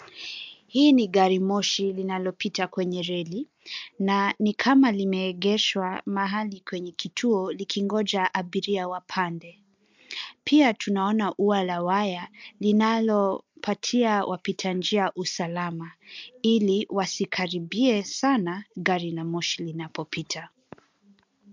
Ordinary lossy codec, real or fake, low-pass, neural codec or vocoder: MP3, 64 kbps; real; 7.2 kHz; none